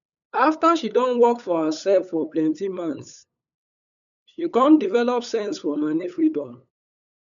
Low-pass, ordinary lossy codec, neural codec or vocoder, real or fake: 7.2 kHz; none; codec, 16 kHz, 8 kbps, FunCodec, trained on LibriTTS, 25 frames a second; fake